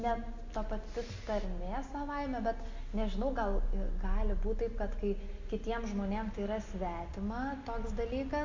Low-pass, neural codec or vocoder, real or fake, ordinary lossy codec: 7.2 kHz; none; real; MP3, 64 kbps